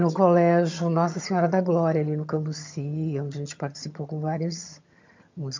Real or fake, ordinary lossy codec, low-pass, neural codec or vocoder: fake; MP3, 64 kbps; 7.2 kHz; vocoder, 22.05 kHz, 80 mel bands, HiFi-GAN